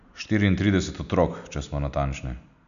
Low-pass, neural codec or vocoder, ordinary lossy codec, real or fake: 7.2 kHz; none; none; real